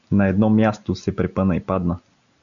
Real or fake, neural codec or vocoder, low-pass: real; none; 7.2 kHz